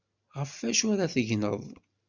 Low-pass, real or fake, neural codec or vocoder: 7.2 kHz; real; none